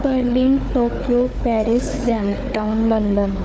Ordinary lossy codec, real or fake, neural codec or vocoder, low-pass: none; fake; codec, 16 kHz, 4 kbps, FunCodec, trained on Chinese and English, 50 frames a second; none